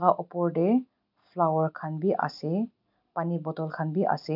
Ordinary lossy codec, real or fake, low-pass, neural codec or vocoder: none; real; 5.4 kHz; none